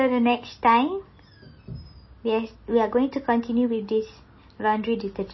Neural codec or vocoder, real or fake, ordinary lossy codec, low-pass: none; real; MP3, 24 kbps; 7.2 kHz